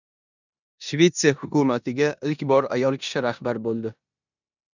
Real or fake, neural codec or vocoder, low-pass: fake; codec, 16 kHz in and 24 kHz out, 0.9 kbps, LongCat-Audio-Codec, four codebook decoder; 7.2 kHz